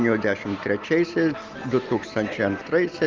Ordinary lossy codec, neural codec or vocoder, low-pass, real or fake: Opus, 32 kbps; none; 7.2 kHz; real